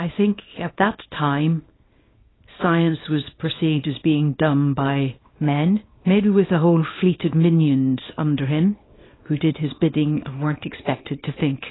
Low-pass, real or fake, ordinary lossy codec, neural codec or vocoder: 7.2 kHz; fake; AAC, 16 kbps; codec, 24 kHz, 0.9 kbps, WavTokenizer, small release